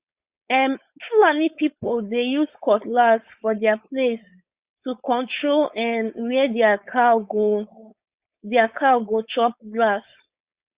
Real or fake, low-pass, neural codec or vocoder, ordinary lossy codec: fake; 3.6 kHz; codec, 16 kHz, 4.8 kbps, FACodec; Opus, 64 kbps